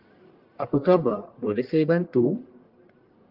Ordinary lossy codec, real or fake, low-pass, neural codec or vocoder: Opus, 24 kbps; fake; 5.4 kHz; codec, 44.1 kHz, 1.7 kbps, Pupu-Codec